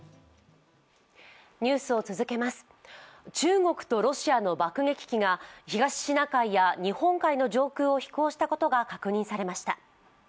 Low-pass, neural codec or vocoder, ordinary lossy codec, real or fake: none; none; none; real